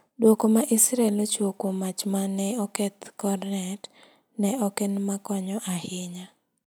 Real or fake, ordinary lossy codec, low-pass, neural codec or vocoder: real; none; none; none